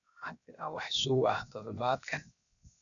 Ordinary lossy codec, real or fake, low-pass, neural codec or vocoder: none; fake; 7.2 kHz; codec, 16 kHz, 0.7 kbps, FocalCodec